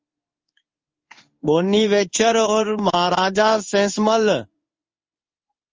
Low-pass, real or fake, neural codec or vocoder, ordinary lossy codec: 7.2 kHz; fake; codec, 16 kHz in and 24 kHz out, 1 kbps, XY-Tokenizer; Opus, 32 kbps